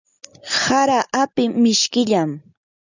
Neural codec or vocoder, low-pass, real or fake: none; 7.2 kHz; real